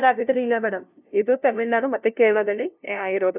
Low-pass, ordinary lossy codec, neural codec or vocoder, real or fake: 3.6 kHz; none; codec, 16 kHz, 0.5 kbps, FunCodec, trained on LibriTTS, 25 frames a second; fake